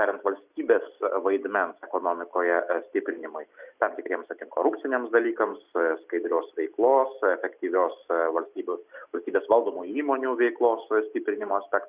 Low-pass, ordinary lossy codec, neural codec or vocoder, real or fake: 3.6 kHz; Opus, 64 kbps; none; real